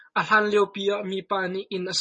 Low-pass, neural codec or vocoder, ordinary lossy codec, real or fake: 10.8 kHz; none; MP3, 32 kbps; real